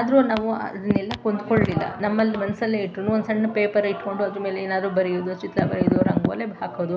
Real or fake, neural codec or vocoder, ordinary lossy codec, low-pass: real; none; none; none